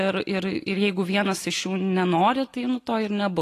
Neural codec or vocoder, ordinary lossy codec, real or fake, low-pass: none; AAC, 48 kbps; real; 14.4 kHz